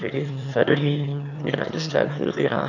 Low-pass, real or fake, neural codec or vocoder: 7.2 kHz; fake; autoencoder, 22.05 kHz, a latent of 192 numbers a frame, VITS, trained on one speaker